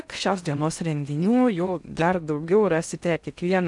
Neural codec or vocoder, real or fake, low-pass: codec, 16 kHz in and 24 kHz out, 0.6 kbps, FocalCodec, streaming, 4096 codes; fake; 10.8 kHz